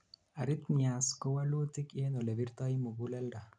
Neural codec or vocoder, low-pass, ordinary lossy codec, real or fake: none; 9.9 kHz; none; real